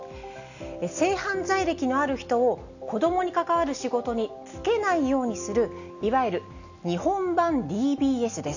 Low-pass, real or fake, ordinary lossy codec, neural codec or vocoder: 7.2 kHz; real; none; none